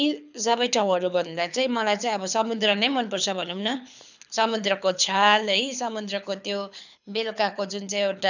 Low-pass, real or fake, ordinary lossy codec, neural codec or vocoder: 7.2 kHz; fake; none; codec, 24 kHz, 6 kbps, HILCodec